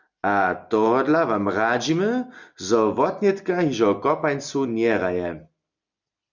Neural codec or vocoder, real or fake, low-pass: none; real; 7.2 kHz